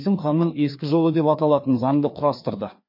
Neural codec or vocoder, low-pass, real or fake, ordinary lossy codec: codec, 16 kHz, 2 kbps, FreqCodec, larger model; 5.4 kHz; fake; MP3, 32 kbps